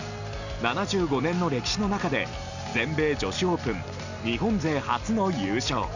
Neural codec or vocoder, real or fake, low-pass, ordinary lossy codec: none; real; 7.2 kHz; none